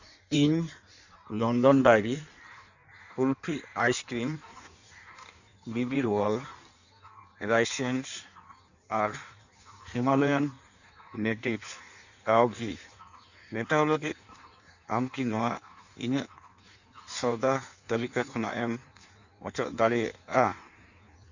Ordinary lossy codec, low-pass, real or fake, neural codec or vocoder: none; 7.2 kHz; fake; codec, 16 kHz in and 24 kHz out, 1.1 kbps, FireRedTTS-2 codec